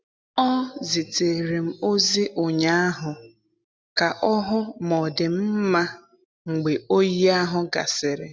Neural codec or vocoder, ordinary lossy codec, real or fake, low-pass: none; none; real; none